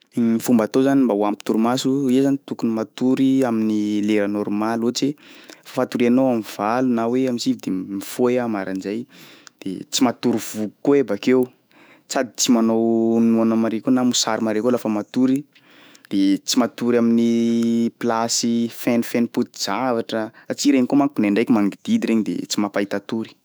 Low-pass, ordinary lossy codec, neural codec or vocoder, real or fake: none; none; autoencoder, 48 kHz, 128 numbers a frame, DAC-VAE, trained on Japanese speech; fake